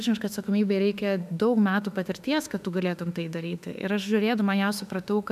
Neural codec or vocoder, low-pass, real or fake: autoencoder, 48 kHz, 32 numbers a frame, DAC-VAE, trained on Japanese speech; 14.4 kHz; fake